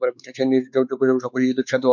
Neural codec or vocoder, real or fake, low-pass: codec, 16 kHz, 4 kbps, X-Codec, WavLM features, trained on Multilingual LibriSpeech; fake; 7.2 kHz